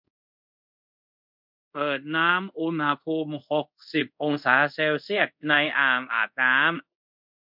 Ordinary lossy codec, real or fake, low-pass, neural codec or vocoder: MP3, 48 kbps; fake; 5.4 kHz; codec, 24 kHz, 0.5 kbps, DualCodec